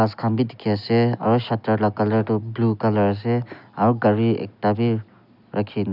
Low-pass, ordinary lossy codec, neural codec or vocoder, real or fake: 5.4 kHz; none; none; real